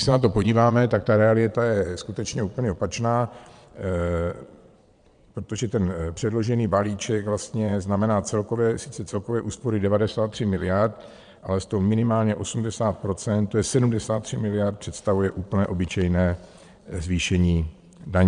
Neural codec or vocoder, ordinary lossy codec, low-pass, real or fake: vocoder, 22.05 kHz, 80 mel bands, Vocos; MP3, 96 kbps; 9.9 kHz; fake